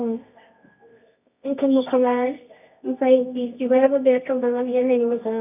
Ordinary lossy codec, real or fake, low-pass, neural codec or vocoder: none; fake; 3.6 kHz; codec, 24 kHz, 0.9 kbps, WavTokenizer, medium music audio release